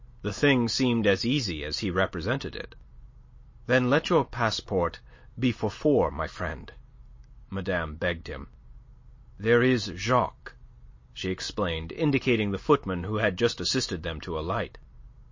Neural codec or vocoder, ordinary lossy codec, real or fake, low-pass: none; MP3, 32 kbps; real; 7.2 kHz